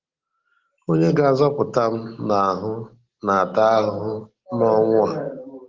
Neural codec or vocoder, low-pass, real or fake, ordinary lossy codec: none; 7.2 kHz; real; Opus, 16 kbps